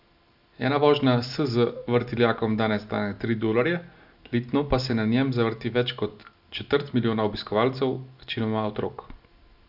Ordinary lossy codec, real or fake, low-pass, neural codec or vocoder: none; real; 5.4 kHz; none